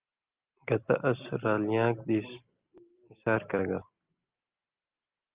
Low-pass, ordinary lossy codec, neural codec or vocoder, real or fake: 3.6 kHz; Opus, 32 kbps; none; real